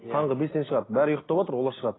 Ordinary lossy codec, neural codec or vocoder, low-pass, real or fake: AAC, 16 kbps; none; 7.2 kHz; real